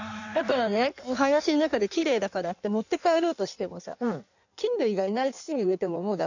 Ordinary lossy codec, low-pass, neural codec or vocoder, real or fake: none; 7.2 kHz; codec, 16 kHz in and 24 kHz out, 1.1 kbps, FireRedTTS-2 codec; fake